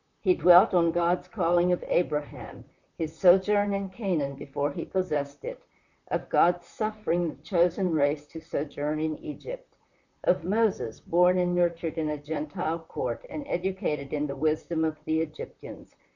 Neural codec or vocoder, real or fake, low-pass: vocoder, 44.1 kHz, 128 mel bands, Pupu-Vocoder; fake; 7.2 kHz